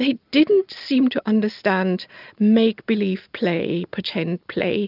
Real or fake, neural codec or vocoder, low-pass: real; none; 5.4 kHz